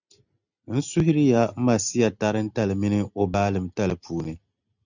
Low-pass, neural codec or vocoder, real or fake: 7.2 kHz; none; real